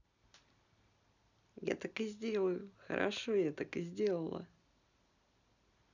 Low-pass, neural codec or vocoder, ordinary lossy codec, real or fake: 7.2 kHz; none; none; real